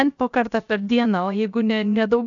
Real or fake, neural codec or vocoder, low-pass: fake; codec, 16 kHz, about 1 kbps, DyCAST, with the encoder's durations; 7.2 kHz